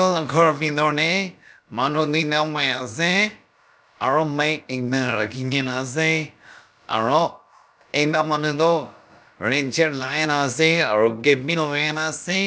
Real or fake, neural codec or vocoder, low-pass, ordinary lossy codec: fake; codec, 16 kHz, about 1 kbps, DyCAST, with the encoder's durations; none; none